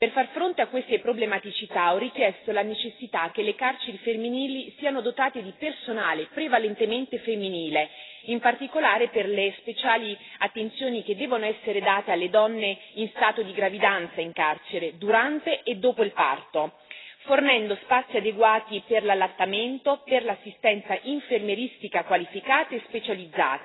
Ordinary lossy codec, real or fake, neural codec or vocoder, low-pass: AAC, 16 kbps; real; none; 7.2 kHz